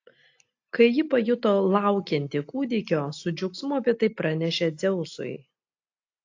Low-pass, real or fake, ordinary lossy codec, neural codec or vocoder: 7.2 kHz; real; AAC, 48 kbps; none